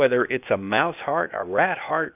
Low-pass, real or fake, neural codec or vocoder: 3.6 kHz; fake; codec, 16 kHz, 0.8 kbps, ZipCodec